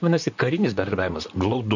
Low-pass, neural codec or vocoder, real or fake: 7.2 kHz; vocoder, 44.1 kHz, 128 mel bands, Pupu-Vocoder; fake